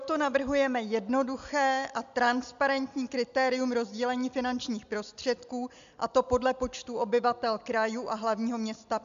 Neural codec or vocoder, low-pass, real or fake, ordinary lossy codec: none; 7.2 kHz; real; MP3, 64 kbps